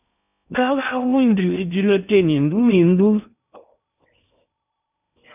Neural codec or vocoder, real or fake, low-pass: codec, 16 kHz in and 24 kHz out, 0.6 kbps, FocalCodec, streaming, 4096 codes; fake; 3.6 kHz